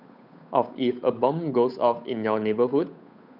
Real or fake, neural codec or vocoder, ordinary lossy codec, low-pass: fake; codec, 16 kHz, 8 kbps, FunCodec, trained on Chinese and English, 25 frames a second; none; 5.4 kHz